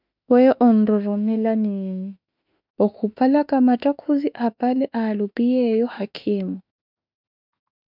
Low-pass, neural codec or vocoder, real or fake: 5.4 kHz; autoencoder, 48 kHz, 32 numbers a frame, DAC-VAE, trained on Japanese speech; fake